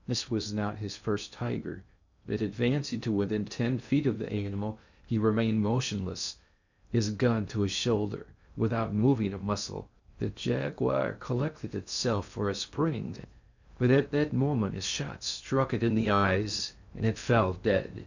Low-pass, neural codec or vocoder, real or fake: 7.2 kHz; codec, 16 kHz in and 24 kHz out, 0.6 kbps, FocalCodec, streaming, 2048 codes; fake